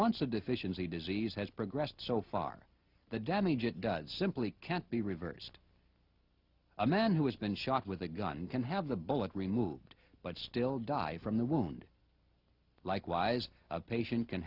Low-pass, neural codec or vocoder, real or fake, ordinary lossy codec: 5.4 kHz; none; real; Opus, 64 kbps